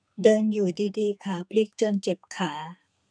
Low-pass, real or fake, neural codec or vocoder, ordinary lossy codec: 9.9 kHz; fake; codec, 32 kHz, 1.9 kbps, SNAC; none